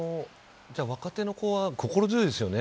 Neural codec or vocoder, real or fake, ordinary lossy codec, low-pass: none; real; none; none